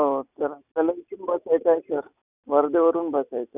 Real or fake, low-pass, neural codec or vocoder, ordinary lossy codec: real; 3.6 kHz; none; none